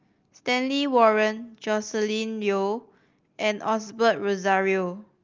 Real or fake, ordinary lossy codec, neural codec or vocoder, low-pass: real; Opus, 24 kbps; none; 7.2 kHz